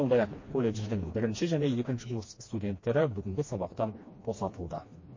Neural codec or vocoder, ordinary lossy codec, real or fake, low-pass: codec, 16 kHz, 2 kbps, FreqCodec, smaller model; MP3, 32 kbps; fake; 7.2 kHz